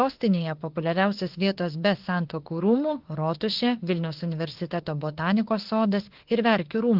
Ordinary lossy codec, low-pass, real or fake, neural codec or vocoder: Opus, 16 kbps; 5.4 kHz; fake; autoencoder, 48 kHz, 32 numbers a frame, DAC-VAE, trained on Japanese speech